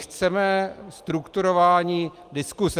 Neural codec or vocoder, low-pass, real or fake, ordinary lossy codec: none; 14.4 kHz; real; Opus, 32 kbps